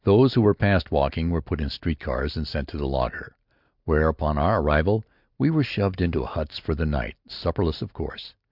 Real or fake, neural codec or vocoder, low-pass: real; none; 5.4 kHz